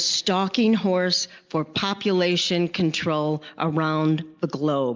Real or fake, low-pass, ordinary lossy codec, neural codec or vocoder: real; 7.2 kHz; Opus, 24 kbps; none